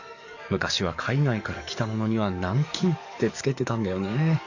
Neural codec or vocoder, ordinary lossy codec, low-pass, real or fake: codec, 44.1 kHz, 7.8 kbps, DAC; none; 7.2 kHz; fake